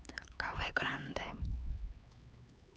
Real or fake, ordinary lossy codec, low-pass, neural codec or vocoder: fake; none; none; codec, 16 kHz, 4 kbps, X-Codec, HuBERT features, trained on LibriSpeech